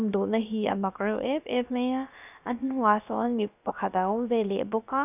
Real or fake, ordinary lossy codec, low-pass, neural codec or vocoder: fake; none; 3.6 kHz; codec, 16 kHz, about 1 kbps, DyCAST, with the encoder's durations